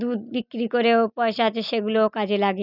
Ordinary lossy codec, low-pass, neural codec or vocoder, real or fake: none; 5.4 kHz; none; real